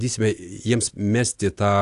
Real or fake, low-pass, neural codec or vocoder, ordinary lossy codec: real; 10.8 kHz; none; AAC, 64 kbps